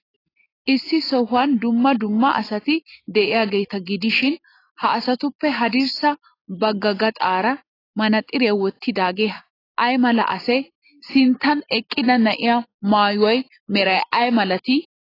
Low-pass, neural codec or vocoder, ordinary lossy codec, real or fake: 5.4 kHz; none; AAC, 24 kbps; real